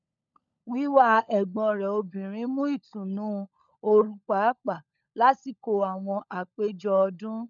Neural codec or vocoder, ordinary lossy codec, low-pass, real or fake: codec, 16 kHz, 16 kbps, FunCodec, trained on LibriTTS, 50 frames a second; none; 7.2 kHz; fake